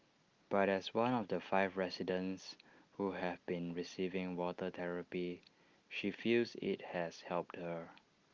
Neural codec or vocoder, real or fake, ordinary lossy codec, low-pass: none; real; Opus, 32 kbps; 7.2 kHz